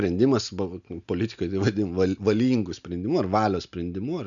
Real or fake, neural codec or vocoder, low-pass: real; none; 7.2 kHz